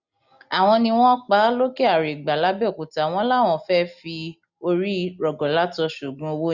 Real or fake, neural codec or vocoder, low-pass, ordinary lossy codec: real; none; 7.2 kHz; none